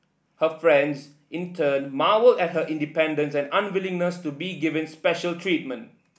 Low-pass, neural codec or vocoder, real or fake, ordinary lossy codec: none; none; real; none